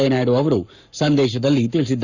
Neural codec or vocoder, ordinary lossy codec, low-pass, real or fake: codec, 44.1 kHz, 7.8 kbps, Pupu-Codec; none; 7.2 kHz; fake